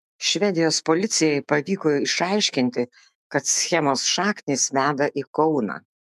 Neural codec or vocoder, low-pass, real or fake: autoencoder, 48 kHz, 128 numbers a frame, DAC-VAE, trained on Japanese speech; 14.4 kHz; fake